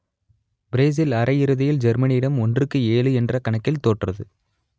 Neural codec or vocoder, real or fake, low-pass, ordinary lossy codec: none; real; none; none